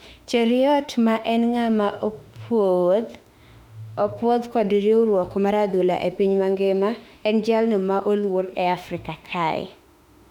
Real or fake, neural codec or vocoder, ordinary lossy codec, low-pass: fake; autoencoder, 48 kHz, 32 numbers a frame, DAC-VAE, trained on Japanese speech; none; 19.8 kHz